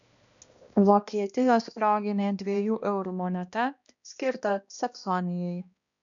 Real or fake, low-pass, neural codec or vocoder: fake; 7.2 kHz; codec, 16 kHz, 1 kbps, X-Codec, HuBERT features, trained on balanced general audio